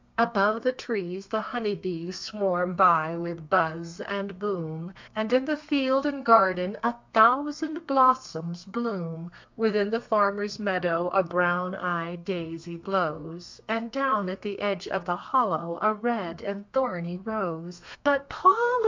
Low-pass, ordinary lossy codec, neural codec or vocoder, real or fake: 7.2 kHz; MP3, 64 kbps; codec, 32 kHz, 1.9 kbps, SNAC; fake